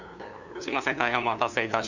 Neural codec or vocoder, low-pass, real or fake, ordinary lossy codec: codec, 16 kHz, 2 kbps, FunCodec, trained on LibriTTS, 25 frames a second; 7.2 kHz; fake; none